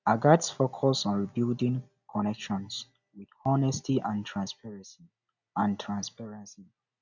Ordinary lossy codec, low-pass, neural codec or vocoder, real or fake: none; 7.2 kHz; none; real